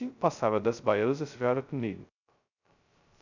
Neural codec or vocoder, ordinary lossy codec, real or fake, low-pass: codec, 16 kHz, 0.2 kbps, FocalCodec; none; fake; 7.2 kHz